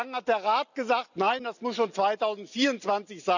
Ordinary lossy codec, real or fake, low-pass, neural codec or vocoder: none; real; 7.2 kHz; none